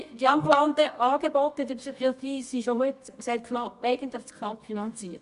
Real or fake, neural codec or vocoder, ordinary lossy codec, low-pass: fake; codec, 24 kHz, 0.9 kbps, WavTokenizer, medium music audio release; none; 10.8 kHz